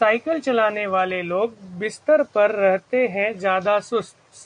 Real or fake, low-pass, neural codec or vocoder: real; 9.9 kHz; none